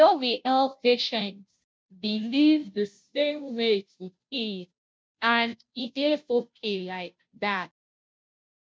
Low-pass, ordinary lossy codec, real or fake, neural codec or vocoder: none; none; fake; codec, 16 kHz, 0.5 kbps, FunCodec, trained on Chinese and English, 25 frames a second